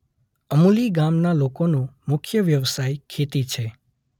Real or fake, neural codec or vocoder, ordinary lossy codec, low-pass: real; none; none; 19.8 kHz